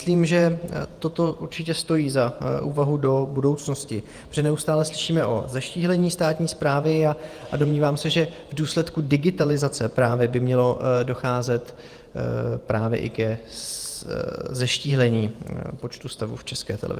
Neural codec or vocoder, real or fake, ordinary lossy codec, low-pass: vocoder, 48 kHz, 128 mel bands, Vocos; fake; Opus, 32 kbps; 14.4 kHz